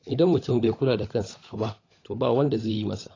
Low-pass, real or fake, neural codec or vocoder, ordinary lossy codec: 7.2 kHz; fake; codec, 16 kHz, 16 kbps, FunCodec, trained on LibriTTS, 50 frames a second; AAC, 32 kbps